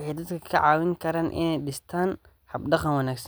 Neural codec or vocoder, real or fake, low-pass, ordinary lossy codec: none; real; none; none